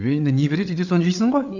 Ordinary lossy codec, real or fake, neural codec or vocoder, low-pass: none; fake; codec, 16 kHz, 8 kbps, FreqCodec, larger model; 7.2 kHz